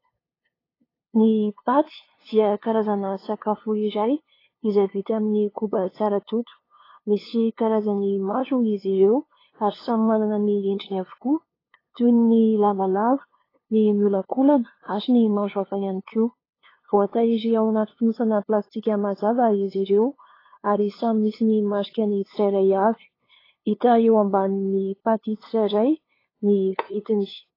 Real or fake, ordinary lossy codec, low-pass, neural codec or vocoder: fake; AAC, 24 kbps; 5.4 kHz; codec, 16 kHz, 2 kbps, FunCodec, trained on LibriTTS, 25 frames a second